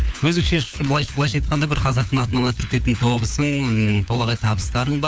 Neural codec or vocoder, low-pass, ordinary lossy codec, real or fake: codec, 16 kHz, 4 kbps, FunCodec, trained on LibriTTS, 50 frames a second; none; none; fake